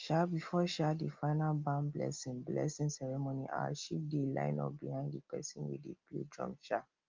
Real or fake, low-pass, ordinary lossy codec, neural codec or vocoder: real; 7.2 kHz; Opus, 24 kbps; none